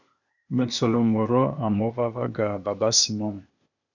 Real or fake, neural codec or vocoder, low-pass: fake; codec, 16 kHz, 0.8 kbps, ZipCodec; 7.2 kHz